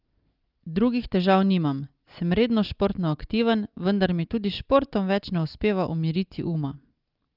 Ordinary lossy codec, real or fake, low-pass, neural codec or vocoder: Opus, 24 kbps; real; 5.4 kHz; none